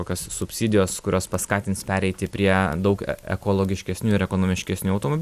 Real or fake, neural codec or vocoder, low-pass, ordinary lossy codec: real; none; 14.4 kHz; Opus, 64 kbps